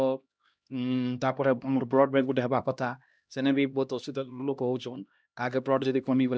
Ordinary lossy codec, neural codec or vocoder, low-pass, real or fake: none; codec, 16 kHz, 1 kbps, X-Codec, HuBERT features, trained on LibriSpeech; none; fake